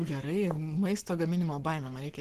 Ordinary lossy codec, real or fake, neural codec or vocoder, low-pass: Opus, 16 kbps; fake; codec, 44.1 kHz, 7.8 kbps, Pupu-Codec; 14.4 kHz